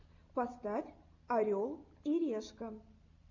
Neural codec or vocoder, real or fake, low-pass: none; real; 7.2 kHz